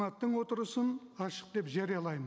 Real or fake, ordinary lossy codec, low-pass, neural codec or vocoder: real; none; none; none